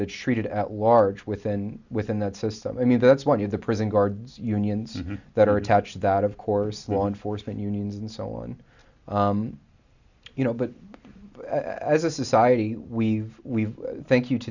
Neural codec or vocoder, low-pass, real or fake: none; 7.2 kHz; real